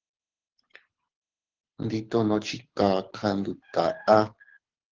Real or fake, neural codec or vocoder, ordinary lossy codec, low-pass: fake; codec, 24 kHz, 6 kbps, HILCodec; Opus, 16 kbps; 7.2 kHz